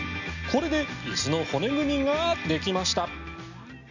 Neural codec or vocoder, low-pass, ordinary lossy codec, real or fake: none; 7.2 kHz; none; real